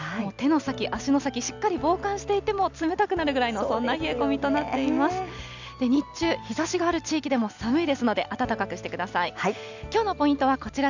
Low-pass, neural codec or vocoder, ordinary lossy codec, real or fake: 7.2 kHz; none; none; real